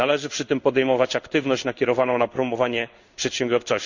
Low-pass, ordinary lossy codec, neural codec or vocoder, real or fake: 7.2 kHz; none; codec, 16 kHz in and 24 kHz out, 1 kbps, XY-Tokenizer; fake